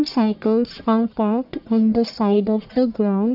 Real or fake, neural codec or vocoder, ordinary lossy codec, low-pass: fake; codec, 44.1 kHz, 1.7 kbps, Pupu-Codec; none; 5.4 kHz